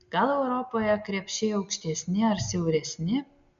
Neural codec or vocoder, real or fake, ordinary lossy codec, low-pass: none; real; MP3, 64 kbps; 7.2 kHz